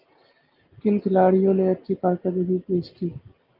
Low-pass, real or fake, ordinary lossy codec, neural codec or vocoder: 5.4 kHz; real; Opus, 24 kbps; none